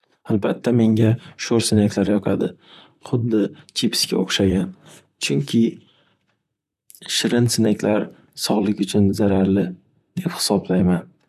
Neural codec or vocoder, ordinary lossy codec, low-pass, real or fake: vocoder, 44.1 kHz, 128 mel bands, Pupu-Vocoder; none; 14.4 kHz; fake